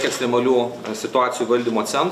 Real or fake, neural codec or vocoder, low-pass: real; none; 14.4 kHz